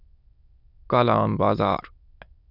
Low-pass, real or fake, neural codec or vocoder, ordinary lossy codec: 5.4 kHz; fake; autoencoder, 22.05 kHz, a latent of 192 numbers a frame, VITS, trained on many speakers; Opus, 64 kbps